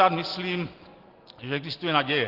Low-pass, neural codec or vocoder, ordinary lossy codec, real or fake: 5.4 kHz; none; Opus, 16 kbps; real